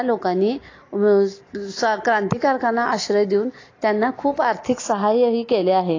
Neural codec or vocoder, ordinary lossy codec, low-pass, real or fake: none; AAC, 32 kbps; 7.2 kHz; real